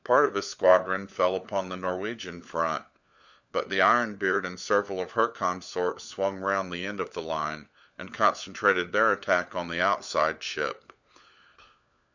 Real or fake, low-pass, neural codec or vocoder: fake; 7.2 kHz; codec, 16 kHz, 4 kbps, FunCodec, trained on LibriTTS, 50 frames a second